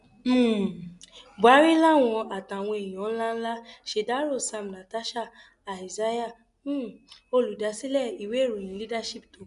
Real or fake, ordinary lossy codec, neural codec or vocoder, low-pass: real; none; none; 10.8 kHz